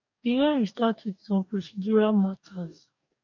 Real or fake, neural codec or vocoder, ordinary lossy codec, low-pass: fake; codec, 44.1 kHz, 2.6 kbps, DAC; none; 7.2 kHz